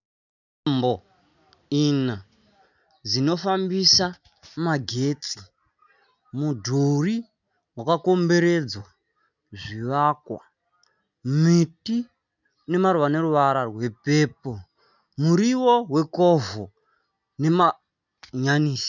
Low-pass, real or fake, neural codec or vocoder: 7.2 kHz; real; none